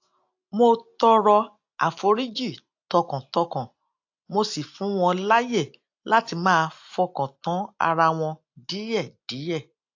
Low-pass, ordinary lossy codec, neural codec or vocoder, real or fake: 7.2 kHz; AAC, 48 kbps; none; real